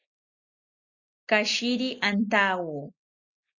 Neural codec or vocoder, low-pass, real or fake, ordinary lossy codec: none; 7.2 kHz; real; Opus, 64 kbps